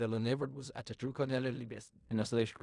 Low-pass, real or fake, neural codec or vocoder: 10.8 kHz; fake; codec, 16 kHz in and 24 kHz out, 0.4 kbps, LongCat-Audio-Codec, fine tuned four codebook decoder